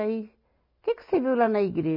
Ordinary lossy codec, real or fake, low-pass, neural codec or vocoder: none; real; 5.4 kHz; none